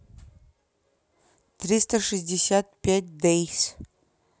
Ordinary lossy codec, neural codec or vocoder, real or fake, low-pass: none; none; real; none